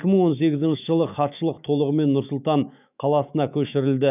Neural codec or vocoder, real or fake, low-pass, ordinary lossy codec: autoencoder, 48 kHz, 128 numbers a frame, DAC-VAE, trained on Japanese speech; fake; 3.6 kHz; none